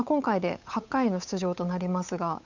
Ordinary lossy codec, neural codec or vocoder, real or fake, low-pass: none; codec, 16 kHz, 8 kbps, FunCodec, trained on Chinese and English, 25 frames a second; fake; 7.2 kHz